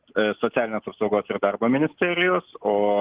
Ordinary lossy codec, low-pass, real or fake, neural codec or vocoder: Opus, 16 kbps; 3.6 kHz; real; none